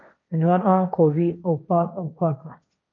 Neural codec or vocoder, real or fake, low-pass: codec, 16 kHz, 1.1 kbps, Voila-Tokenizer; fake; 7.2 kHz